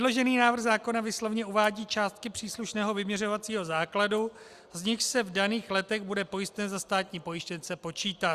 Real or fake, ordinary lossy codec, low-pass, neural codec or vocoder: real; Opus, 64 kbps; 14.4 kHz; none